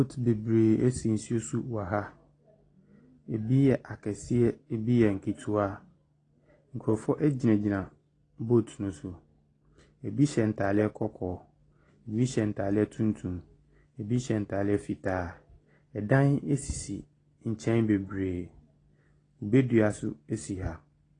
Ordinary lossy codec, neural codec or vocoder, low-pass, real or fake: AAC, 32 kbps; none; 9.9 kHz; real